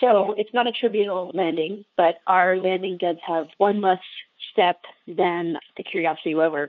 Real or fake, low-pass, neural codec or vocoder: fake; 7.2 kHz; codec, 16 kHz, 2 kbps, FunCodec, trained on LibriTTS, 25 frames a second